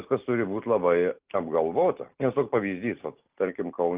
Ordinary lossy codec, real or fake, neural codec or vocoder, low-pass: Opus, 32 kbps; real; none; 3.6 kHz